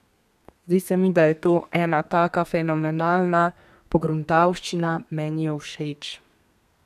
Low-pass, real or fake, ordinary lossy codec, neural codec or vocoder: 14.4 kHz; fake; none; codec, 32 kHz, 1.9 kbps, SNAC